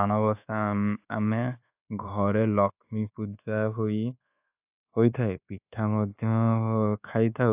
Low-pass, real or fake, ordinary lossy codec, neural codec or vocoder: 3.6 kHz; fake; AAC, 32 kbps; codec, 24 kHz, 1.2 kbps, DualCodec